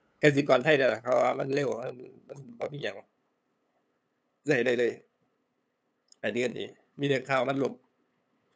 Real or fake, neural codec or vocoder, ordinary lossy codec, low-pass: fake; codec, 16 kHz, 8 kbps, FunCodec, trained on LibriTTS, 25 frames a second; none; none